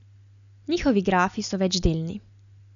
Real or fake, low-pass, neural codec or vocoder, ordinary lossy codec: real; 7.2 kHz; none; none